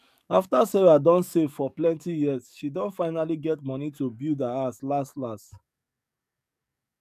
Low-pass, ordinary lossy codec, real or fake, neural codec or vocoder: 14.4 kHz; none; fake; codec, 44.1 kHz, 7.8 kbps, DAC